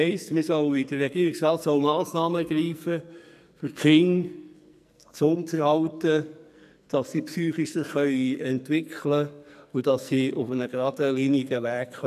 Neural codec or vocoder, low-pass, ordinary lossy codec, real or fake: codec, 44.1 kHz, 2.6 kbps, SNAC; 14.4 kHz; none; fake